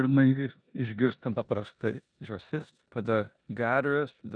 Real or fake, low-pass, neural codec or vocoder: fake; 9.9 kHz; codec, 16 kHz in and 24 kHz out, 0.9 kbps, LongCat-Audio-Codec, four codebook decoder